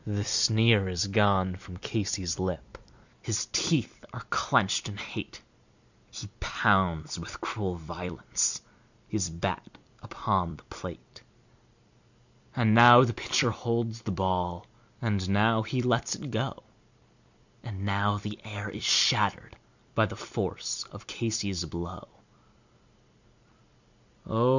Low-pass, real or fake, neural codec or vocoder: 7.2 kHz; fake; vocoder, 44.1 kHz, 128 mel bands every 256 samples, BigVGAN v2